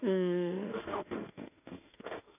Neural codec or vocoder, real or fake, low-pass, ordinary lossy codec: autoencoder, 48 kHz, 32 numbers a frame, DAC-VAE, trained on Japanese speech; fake; 3.6 kHz; none